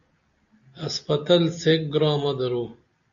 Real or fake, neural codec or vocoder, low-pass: real; none; 7.2 kHz